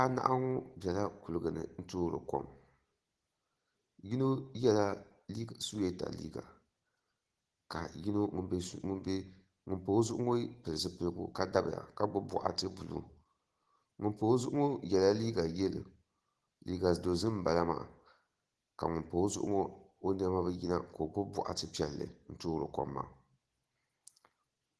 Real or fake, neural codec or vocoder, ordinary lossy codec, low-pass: real; none; Opus, 16 kbps; 10.8 kHz